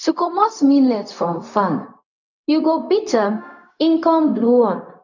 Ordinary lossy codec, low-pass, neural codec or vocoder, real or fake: none; 7.2 kHz; codec, 16 kHz, 0.4 kbps, LongCat-Audio-Codec; fake